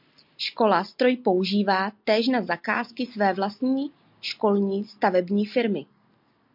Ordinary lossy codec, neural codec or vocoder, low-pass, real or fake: MP3, 48 kbps; none; 5.4 kHz; real